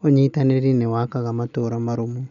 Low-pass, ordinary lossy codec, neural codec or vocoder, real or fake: 7.2 kHz; none; none; real